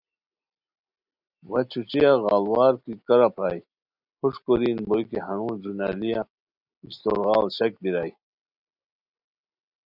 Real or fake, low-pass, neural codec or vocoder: real; 5.4 kHz; none